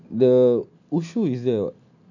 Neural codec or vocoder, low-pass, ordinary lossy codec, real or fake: none; 7.2 kHz; none; real